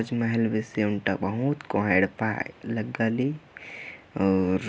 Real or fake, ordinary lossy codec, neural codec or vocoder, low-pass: real; none; none; none